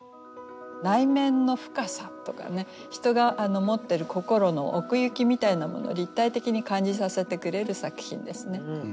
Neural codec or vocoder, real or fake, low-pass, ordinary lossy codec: none; real; none; none